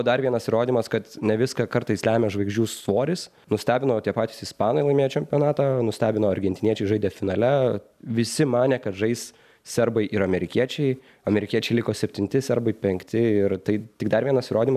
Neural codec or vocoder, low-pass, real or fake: none; 14.4 kHz; real